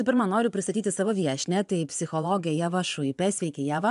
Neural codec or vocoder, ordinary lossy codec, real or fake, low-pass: vocoder, 24 kHz, 100 mel bands, Vocos; AAC, 96 kbps; fake; 10.8 kHz